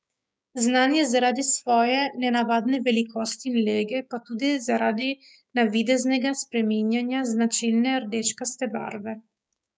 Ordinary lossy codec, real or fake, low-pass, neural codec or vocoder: none; fake; none; codec, 16 kHz, 6 kbps, DAC